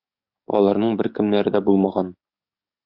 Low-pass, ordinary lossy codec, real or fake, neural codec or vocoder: 5.4 kHz; Opus, 64 kbps; fake; codec, 44.1 kHz, 7.8 kbps, DAC